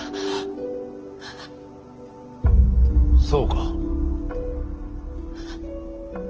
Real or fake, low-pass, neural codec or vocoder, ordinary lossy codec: real; 7.2 kHz; none; Opus, 16 kbps